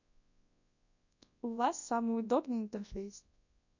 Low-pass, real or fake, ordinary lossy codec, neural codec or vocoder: 7.2 kHz; fake; none; codec, 24 kHz, 0.9 kbps, WavTokenizer, large speech release